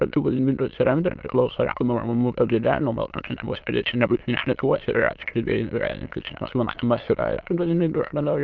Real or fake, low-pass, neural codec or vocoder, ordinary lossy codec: fake; 7.2 kHz; autoencoder, 22.05 kHz, a latent of 192 numbers a frame, VITS, trained on many speakers; Opus, 24 kbps